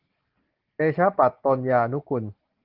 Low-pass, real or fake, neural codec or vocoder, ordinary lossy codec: 5.4 kHz; real; none; Opus, 16 kbps